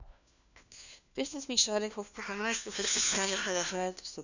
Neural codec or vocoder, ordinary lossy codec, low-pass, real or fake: codec, 16 kHz, 1 kbps, FunCodec, trained on LibriTTS, 50 frames a second; none; 7.2 kHz; fake